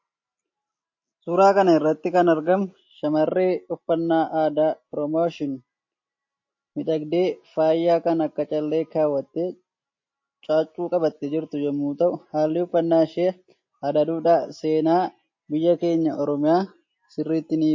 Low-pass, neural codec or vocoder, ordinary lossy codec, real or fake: 7.2 kHz; none; MP3, 32 kbps; real